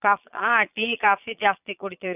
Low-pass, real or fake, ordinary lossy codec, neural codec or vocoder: 3.6 kHz; fake; none; vocoder, 22.05 kHz, 80 mel bands, Vocos